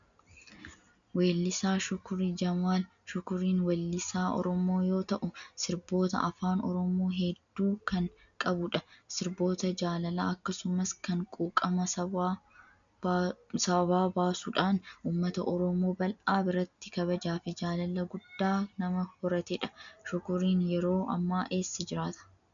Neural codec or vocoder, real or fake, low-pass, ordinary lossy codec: none; real; 7.2 kHz; MP3, 96 kbps